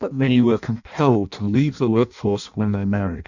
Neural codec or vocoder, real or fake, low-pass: codec, 16 kHz in and 24 kHz out, 0.6 kbps, FireRedTTS-2 codec; fake; 7.2 kHz